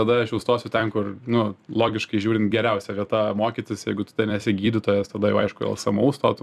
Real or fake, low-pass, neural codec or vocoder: fake; 14.4 kHz; vocoder, 44.1 kHz, 128 mel bands every 256 samples, BigVGAN v2